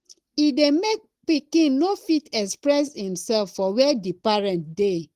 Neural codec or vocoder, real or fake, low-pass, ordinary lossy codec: none; real; 14.4 kHz; Opus, 16 kbps